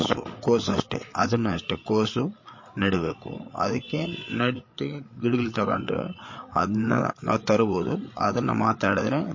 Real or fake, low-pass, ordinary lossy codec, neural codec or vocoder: fake; 7.2 kHz; MP3, 32 kbps; codec, 16 kHz, 8 kbps, FreqCodec, larger model